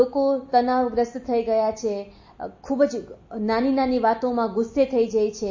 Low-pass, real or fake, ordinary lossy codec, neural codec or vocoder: 7.2 kHz; real; MP3, 32 kbps; none